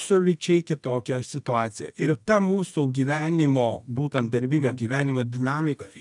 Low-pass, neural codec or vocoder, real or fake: 10.8 kHz; codec, 24 kHz, 0.9 kbps, WavTokenizer, medium music audio release; fake